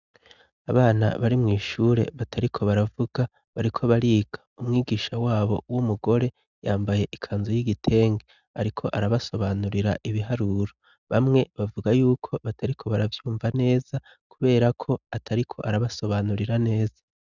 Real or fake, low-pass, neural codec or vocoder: real; 7.2 kHz; none